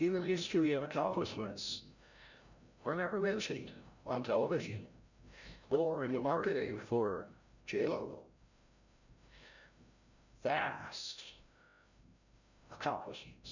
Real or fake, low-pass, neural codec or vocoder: fake; 7.2 kHz; codec, 16 kHz, 0.5 kbps, FreqCodec, larger model